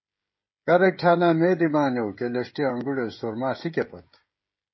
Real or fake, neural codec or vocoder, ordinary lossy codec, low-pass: fake; codec, 16 kHz, 16 kbps, FreqCodec, smaller model; MP3, 24 kbps; 7.2 kHz